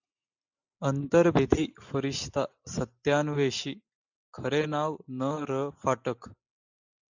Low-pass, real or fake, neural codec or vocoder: 7.2 kHz; real; none